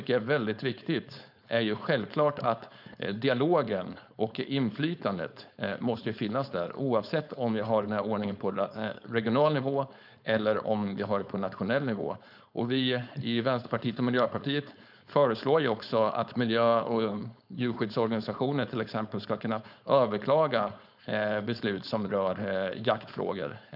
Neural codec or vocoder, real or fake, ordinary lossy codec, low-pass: codec, 16 kHz, 4.8 kbps, FACodec; fake; none; 5.4 kHz